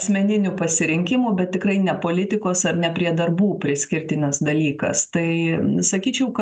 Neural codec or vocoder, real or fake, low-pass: none; real; 10.8 kHz